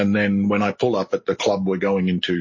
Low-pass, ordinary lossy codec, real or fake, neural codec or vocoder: 7.2 kHz; MP3, 32 kbps; real; none